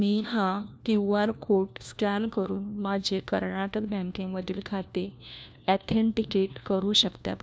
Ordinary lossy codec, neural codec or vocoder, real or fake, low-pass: none; codec, 16 kHz, 1 kbps, FunCodec, trained on LibriTTS, 50 frames a second; fake; none